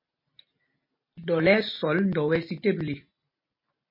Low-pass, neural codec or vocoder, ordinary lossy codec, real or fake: 5.4 kHz; none; MP3, 24 kbps; real